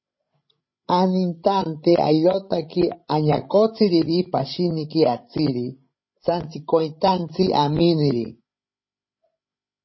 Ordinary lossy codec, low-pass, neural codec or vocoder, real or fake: MP3, 24 kbps; 7.2 kHz; codec, 16 kHz, 16 kbps, FreqCodec, larger model; fake